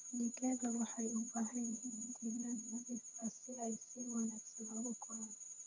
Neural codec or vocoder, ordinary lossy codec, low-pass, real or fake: vocoder, 22.05 kHz, 80 mel bands, HiFi-GAN; none; 7.2 kHz; fake